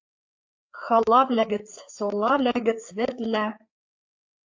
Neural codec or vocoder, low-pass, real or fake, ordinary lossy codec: codec, 16 kHz, 4 kbps, FreqCodec, larger model; 7.2 kHz; fake; AAC, 48 kbps